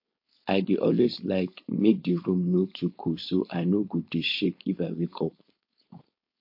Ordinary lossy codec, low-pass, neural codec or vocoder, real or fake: MP3, 32 kbps; 5.4 kHz; codec, 16 kHz, 4.8 kbps, FACodec; fake